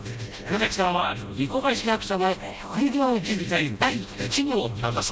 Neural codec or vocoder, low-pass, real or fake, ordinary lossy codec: codec, 16 kHz, 0.5 kbps, FreqCodec, smaller model; none; fake; none